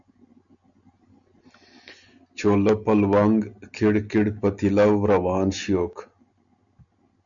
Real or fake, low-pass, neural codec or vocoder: real; 7.2 kHz; none